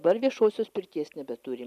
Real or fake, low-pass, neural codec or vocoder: real; 14.4 kHz; none